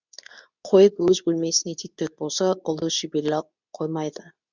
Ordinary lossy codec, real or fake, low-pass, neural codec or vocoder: none; fake; 7.2 kHz; codec, 24 kHz, 0.9 kbps, WavTokenizer, medium speech release version 2